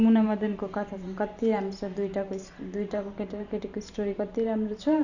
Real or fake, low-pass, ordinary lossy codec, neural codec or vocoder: fake; 7.2 kHz; none; vocoder, 44.1 kHz, 128 mel bands every 512 samples, BigVGAN v2